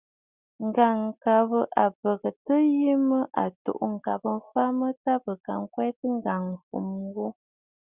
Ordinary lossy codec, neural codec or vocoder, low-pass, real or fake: Opus, 64 kbps; none; 3.6 kHz; real